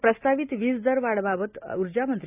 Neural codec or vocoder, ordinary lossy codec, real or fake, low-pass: none; Opus, 64 kbps; real; 3.6 kHz